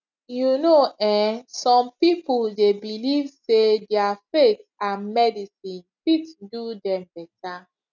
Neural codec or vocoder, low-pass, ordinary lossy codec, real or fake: none; 7.2 kHz; none; real